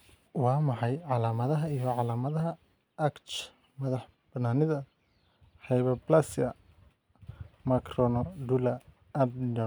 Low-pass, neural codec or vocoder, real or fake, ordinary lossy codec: none; none; real; none